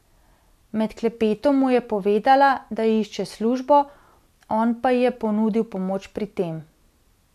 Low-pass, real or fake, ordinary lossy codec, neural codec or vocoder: 14.4 kHz; real; none; none